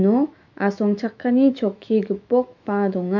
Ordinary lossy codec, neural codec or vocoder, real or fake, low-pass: MP3, 64 kbps; autoencoder, 48 kHz, 128 numbers a frame, DAC-VAE, trained on Japanese speech; fake; 7.2 kHz